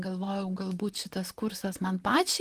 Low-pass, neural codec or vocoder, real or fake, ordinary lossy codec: 14.4 kHz; vocoder, 44.1 kHz, 128 mel bands, Pupu-Vocoder; fake; Opus, 24 kbps